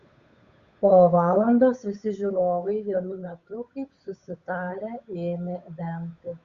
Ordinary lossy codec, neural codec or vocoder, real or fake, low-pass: Opus, 64 kbps; codec, 16 kHz, 8 kbps, FunCodec, trained on Chinese and English, 25 frames a second; fake; 7.2 kHz